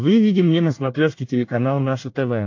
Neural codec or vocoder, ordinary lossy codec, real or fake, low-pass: codec, 24 kHz, 1 kbps, SNAC; AAC, 48 kbps; fake; 7.2 kHz